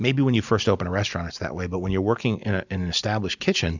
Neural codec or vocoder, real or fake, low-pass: none; real; 7.2 kHz